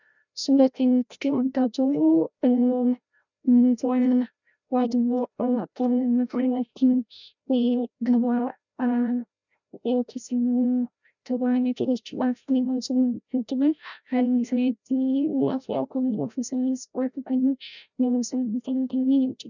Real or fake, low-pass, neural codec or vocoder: fake; 7.2 kHz; codec, 16 kHz, 0.5 kbps, FreqCodec, larger model